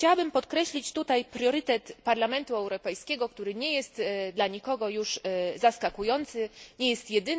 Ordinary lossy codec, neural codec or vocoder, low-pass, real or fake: none; none; none; real